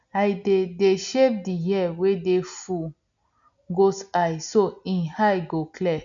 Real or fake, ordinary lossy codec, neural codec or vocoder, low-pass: real; MP3, 96 kbps; none; 7.2 kHz